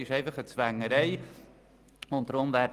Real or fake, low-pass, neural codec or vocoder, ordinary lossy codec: real; 14.4 kHz; none; Opus, 32 kbps